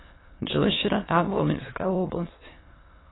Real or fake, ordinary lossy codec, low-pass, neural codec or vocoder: fake; AAC, 16 kbps; 7.2 kHz; autoencoder, 22.05 kHz, a latent of 192 numbers a frame, VITS, trained on many speakers